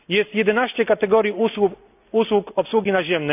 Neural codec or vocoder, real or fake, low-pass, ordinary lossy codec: none; real; 3.6 kHz; none